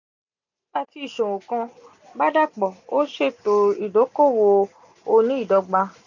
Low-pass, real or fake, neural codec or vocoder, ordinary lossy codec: 7.2 kHz; real; none; none